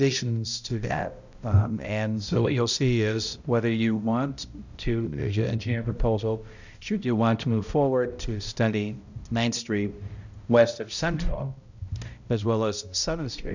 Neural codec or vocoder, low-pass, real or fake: codec, 16 kHz, 0.5 kbps, X-Codec, HuBERT features, trained on balanced general audio; 7.2 kHz; fake